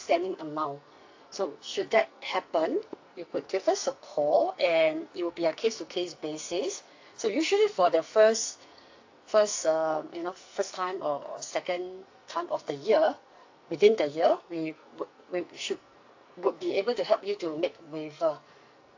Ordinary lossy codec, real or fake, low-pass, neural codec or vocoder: AAC, 48 kbps; fake; 7.2 kHz; codec, 44.1 kHz, 2.6 kbps, SNAC